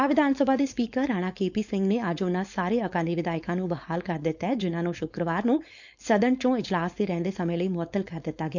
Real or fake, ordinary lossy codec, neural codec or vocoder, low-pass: fake; none; codec, 16 kHz, 4.8 kbps, FACodec; 7.2 kHz